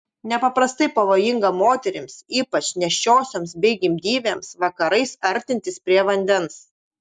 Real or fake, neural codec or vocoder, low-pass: real; none; 9.9 kHz